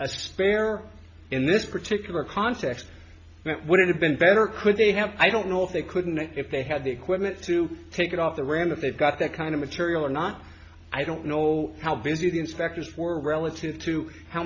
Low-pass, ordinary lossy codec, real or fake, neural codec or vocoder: 7.2 kHz; AAC, 48 kbps; real; none